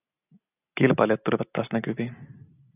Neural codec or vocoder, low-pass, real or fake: none; 3.6 kHz; real